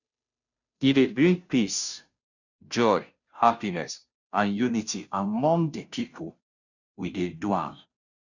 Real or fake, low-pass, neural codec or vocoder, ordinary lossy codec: fake; 7.2 kHz; codec, 16 kHz, 0.5 kbps, FunCodec, trained on Chinese and English, 25 frames a second; none